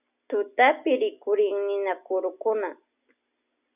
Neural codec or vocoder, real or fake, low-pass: none; real; 3.6 kHz